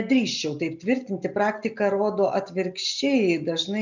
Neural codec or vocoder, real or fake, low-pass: none; real; 7.2 kHz